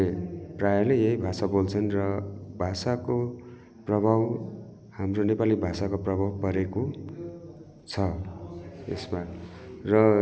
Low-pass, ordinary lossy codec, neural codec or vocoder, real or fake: none; none; none; real